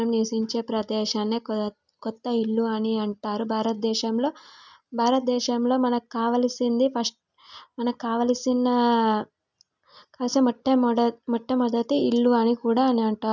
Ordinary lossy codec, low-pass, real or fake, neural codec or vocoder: none; 7.2 kHz; real; none